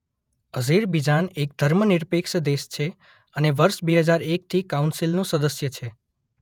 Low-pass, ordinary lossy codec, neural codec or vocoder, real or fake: 19.8 kHz; none; vocoder, 48 kHz, 128 mel bands, Vocos; fake